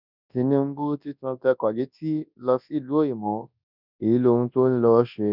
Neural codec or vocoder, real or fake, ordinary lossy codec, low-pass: codec, 24 kHz, 0.9 kbps, WavTokenizer, large speech release; fake; none; 5.4 kHz